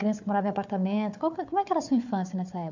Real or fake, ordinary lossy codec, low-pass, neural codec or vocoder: fake; none; 7.2 kHz; codec, 16 kHz, 4 kbps, FunCodec, trained on Chinese and English, 50 frames a second